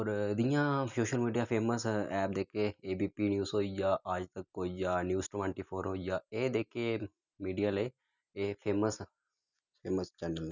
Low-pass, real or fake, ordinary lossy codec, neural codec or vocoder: 7.2 kHz; real; none; none